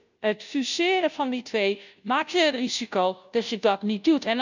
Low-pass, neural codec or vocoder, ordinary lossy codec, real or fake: 7.2 kHz; codec, 16 kHz, 0.5 kbps, FunCodec, trained on Chinese and English, 25 frames a second; none; fake